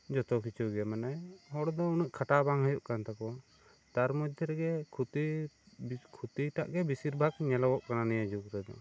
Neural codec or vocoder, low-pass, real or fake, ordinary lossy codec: none; none; real; none